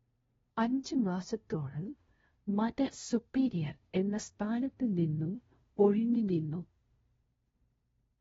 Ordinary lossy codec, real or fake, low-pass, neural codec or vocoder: AAC, 24 kbps; fake; 7.2 kHz; codec, 16 kHz, 0.5 kbps, FunCodec, trained on LibriTTS, 25 frames a second